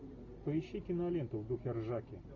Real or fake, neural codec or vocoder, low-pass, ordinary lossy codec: real; none; 7.2 kHz; AAC, 48 kbps